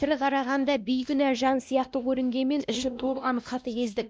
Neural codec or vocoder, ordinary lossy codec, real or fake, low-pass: codec, 16 kHz, 1 kbps, X-Codec, WavLM features, trained on Multilingual LibriSpeech; none; fake; none